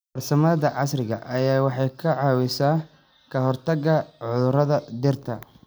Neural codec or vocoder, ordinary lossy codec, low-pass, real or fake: none; none; none; real